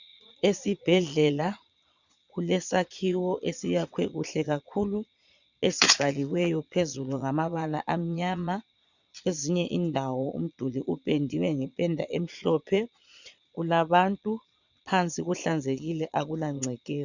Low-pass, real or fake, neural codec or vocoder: 7.2 kHz; fake; vocoder, 22.05 kHz, 80 mel bands, Vocos